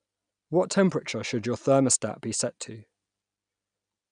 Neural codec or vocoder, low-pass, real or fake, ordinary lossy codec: none; 9.9 kHz; real; none